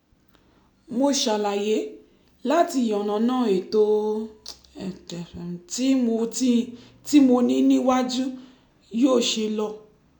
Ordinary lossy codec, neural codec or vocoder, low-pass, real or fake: none; none; 19.8 kHz; real